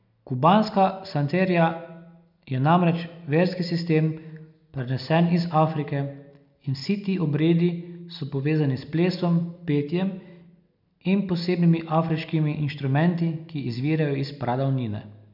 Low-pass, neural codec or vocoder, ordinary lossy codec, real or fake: 5.4 kHz; none; none; real